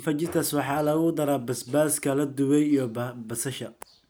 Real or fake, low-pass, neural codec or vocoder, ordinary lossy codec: real; none; none; none